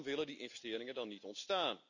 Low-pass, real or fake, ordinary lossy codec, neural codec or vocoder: 7.2 kHz; real; none; none